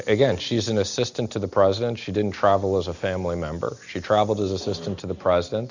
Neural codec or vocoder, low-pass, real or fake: none; 7.2 kHz; real